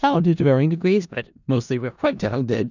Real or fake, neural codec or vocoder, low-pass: fake; codec, 16 kHz in and 24 kHz out, 0.4 kbps, LongCat-Audio-Codec, four codebook decoder; 7.2 kHz